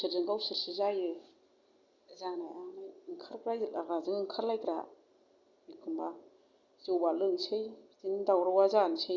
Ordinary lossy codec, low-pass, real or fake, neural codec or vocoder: Opus, 64 kbps; 7.2 kHz; real; none